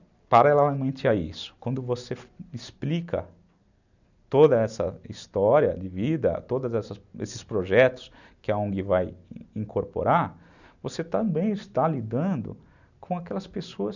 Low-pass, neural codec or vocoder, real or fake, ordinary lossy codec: 7.2 kHz; none; real; none